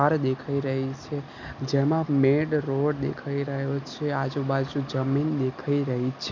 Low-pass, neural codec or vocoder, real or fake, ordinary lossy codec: 7.2 kHz; none; real; none